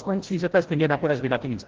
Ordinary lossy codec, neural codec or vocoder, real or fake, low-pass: Opus, 16 kbps; codec, 16 kHz, 0.5 kbps, FreqCodec, larger model; fake; 7.2 kHz